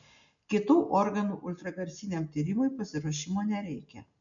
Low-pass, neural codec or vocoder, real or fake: 7.2 kHz; none; real